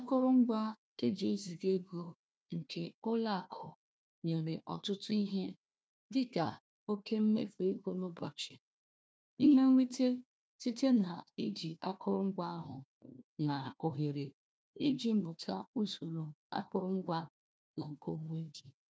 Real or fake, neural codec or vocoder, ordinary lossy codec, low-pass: fake; codec, 16 kHz, 1 kbps, FunCodec, trained on Chinese and English, 50 frames a second; none; none